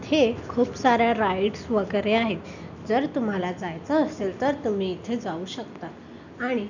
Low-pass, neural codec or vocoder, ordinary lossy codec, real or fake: 7.2 kHz; none; none; real